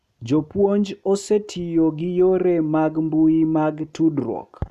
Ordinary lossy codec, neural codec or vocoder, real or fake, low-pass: none; none; real; 14.4 kHz